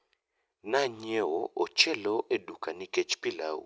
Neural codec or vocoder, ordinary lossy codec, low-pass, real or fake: none; none; none; real